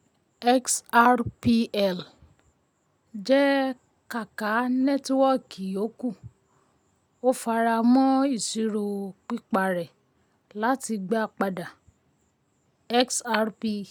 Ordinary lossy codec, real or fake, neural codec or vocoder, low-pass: none; real; none; 19.8 kHz